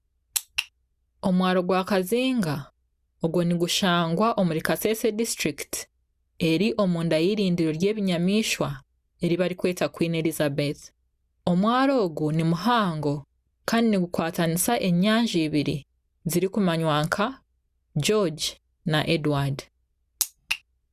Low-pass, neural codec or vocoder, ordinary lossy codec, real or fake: 14.4 kHz; none; Opus, 64 kbps; real